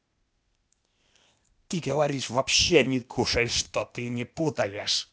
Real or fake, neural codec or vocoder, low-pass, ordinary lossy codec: fake; codec, 16 kHz, 0.8 kbps, ZipCodec; none; none